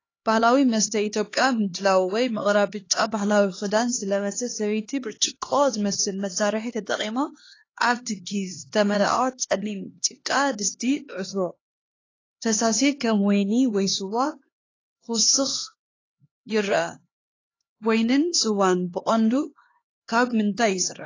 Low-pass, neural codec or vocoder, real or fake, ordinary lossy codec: 7.2 kHz; codec, 16 kHz, 2 kbps, X-Codec, HuBERT features, trained on LibriSpeech; fake; AAC, 32 kbps